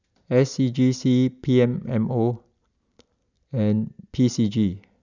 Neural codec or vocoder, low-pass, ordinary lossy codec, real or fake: none; 7.2 kHz; none; real